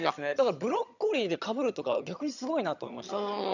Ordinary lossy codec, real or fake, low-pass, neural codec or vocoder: none; fake; 7.2 kHz; vocoder, 22.05 kHz, 80 mel bands, HiFi-GAN